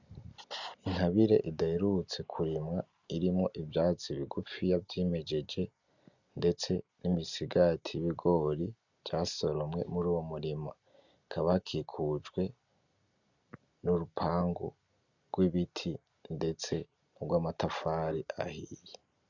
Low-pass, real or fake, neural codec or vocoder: 7.2 kHz; real; none